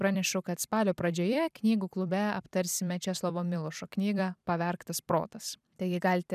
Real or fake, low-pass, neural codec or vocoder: fake; 14.4 kHz; vocoder, 44.1 kHz, 128 mel bands every 256 samples, BigVGAN v2